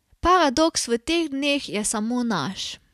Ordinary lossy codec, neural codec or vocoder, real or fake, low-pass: none; none; real; 14.4 kHz